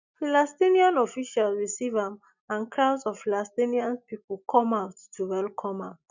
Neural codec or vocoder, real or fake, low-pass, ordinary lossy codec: none; real; 7.2 kHz; none